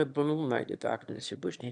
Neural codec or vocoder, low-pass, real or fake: autoencoder, 22.05 kHz, a latent of 192 numbers a frame, VITS, trained on one speaker; 9.9 kHz; fake